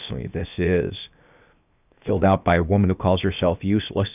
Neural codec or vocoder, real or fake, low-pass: codec, 16 kHz, 0.7 kbps, FocalCodec; fake; 3.6 kHz